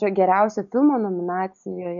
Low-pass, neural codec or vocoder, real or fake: 7.2 kHz; none; real